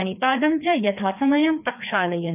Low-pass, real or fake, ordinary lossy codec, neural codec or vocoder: 3.6 kHz; fake; none; codec, 16 kHz, 2 kbps, FreqCodec, larger model